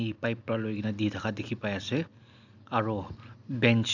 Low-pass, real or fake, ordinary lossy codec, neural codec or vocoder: 7.2 kHz; real; none; none